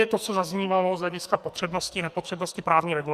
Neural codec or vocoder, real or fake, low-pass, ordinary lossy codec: codec, 44.1 kHz, 2.6 kbps, SNAC; fake; 14.4 kHz; Opus, 64 kbps